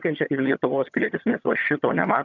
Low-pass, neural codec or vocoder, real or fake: 7.2 kHz; vocoder, 22.05 kHz, 80 mel bands, HiFi-GAN; fake